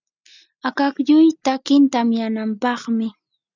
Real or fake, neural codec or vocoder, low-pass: real; none; 7.2 kHz